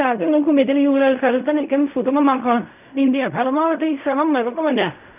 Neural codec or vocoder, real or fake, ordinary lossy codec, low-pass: codec, 16 kHz in and 24 kHz out, 0.4 kbps, LongCat-Audio-Codec, fine tuned four codebook decoder; fake; none; 3.6 kHz